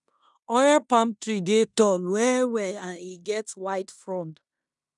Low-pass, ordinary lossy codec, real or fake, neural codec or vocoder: 10.8 kHz; none; fake; codec, 16 kHz in and 24 kHz out, 0.9 kbps, LongCat-Audio-Codec, fine tuned four codebook decoder